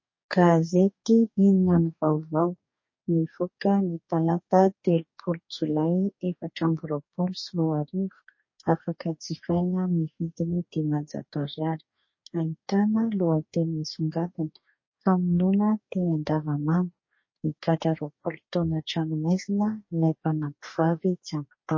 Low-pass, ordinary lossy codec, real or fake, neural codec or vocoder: 7.2 kHz; MP3, 32 kbps; fake; codec, 44.1 kHz, 2.6 kbps, DAC